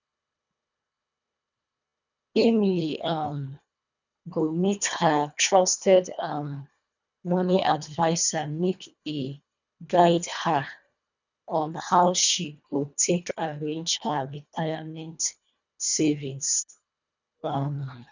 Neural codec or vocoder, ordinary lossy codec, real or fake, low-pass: codec, 24 kHz, 1.5 kbps, HILCodec; none; fake; 7.2 kHz